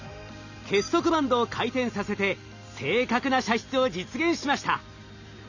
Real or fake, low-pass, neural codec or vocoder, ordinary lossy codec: real; 7.2 kHz; none; none